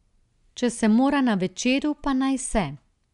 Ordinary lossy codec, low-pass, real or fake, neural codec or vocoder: none; 10.8 kHz; real; none